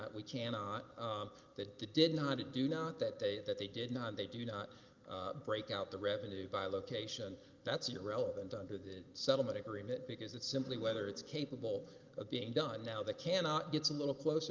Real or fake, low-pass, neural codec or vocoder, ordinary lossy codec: real; 7.2 kHz; none; Opus, 32 kbps